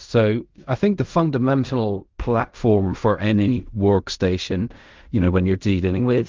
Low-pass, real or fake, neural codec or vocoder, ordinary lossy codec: 7.2 kHz; fake; codec, 16 kHz in and 24 kHz out, 0.4 kbps, LongCat-Audio-Codec, fine tuned four codebook decoder; Opus, 32 kbps